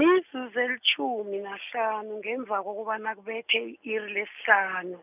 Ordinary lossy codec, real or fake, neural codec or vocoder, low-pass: none; real; none; 3.6 kHz